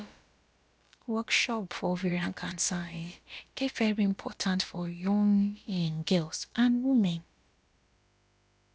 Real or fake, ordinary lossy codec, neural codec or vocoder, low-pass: fake; none; codec, 16 kHz, about 1 kbps, DyCAST, with the encoder's durations; none